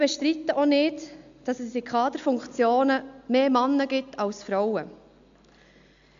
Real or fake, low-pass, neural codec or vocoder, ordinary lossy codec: real; 7.2 kHz; none; MP3, 64 kbps